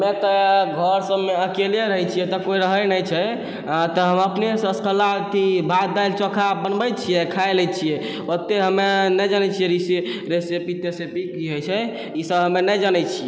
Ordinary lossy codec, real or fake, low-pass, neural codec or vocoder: none; real; none; none